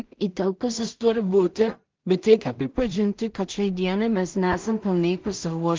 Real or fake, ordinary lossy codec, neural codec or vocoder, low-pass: fake; Opus, 32 kbps; codec, 16 kHz in and 24 kHz out, 0.4 kbps, LongCat-Audio-Codec, two codebook decoder; 7.2 kHz